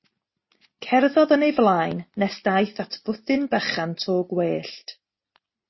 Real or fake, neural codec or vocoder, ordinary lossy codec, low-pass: real; none; MP3, 24 kbps; 7.2 kHz